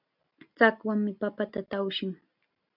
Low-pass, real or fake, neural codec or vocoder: 5.4 kHz; real; none